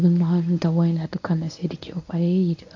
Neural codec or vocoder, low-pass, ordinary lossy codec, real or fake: codec, 24 kHz, 0.9 kbps, WavTokenizer, medium speech release version 1; 7.2 kHz; AAC, 48 kbps; fake